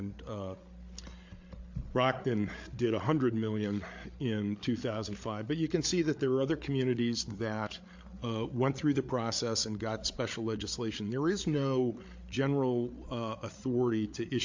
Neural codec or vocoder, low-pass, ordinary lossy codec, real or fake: codec, 16 kHz, 8 kbps, FreqCodec, larger model; 7.2 kHz; MP3, 48 kbps; fake